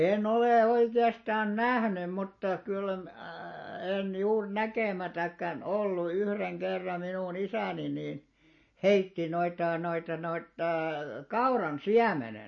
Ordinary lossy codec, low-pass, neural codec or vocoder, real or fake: MP3, 32 kbps; 7.2 kHz; none; real